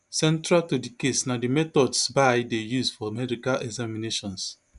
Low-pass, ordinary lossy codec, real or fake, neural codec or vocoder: 10.8 kHz; none; real; none